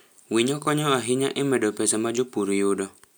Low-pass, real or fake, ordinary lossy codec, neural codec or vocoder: none; real; none; none